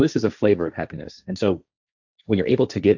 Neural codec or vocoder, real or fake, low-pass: codec, 16 kHz, 1.1 kbps, Voila-Tokenizer; fake; 7.2 kHz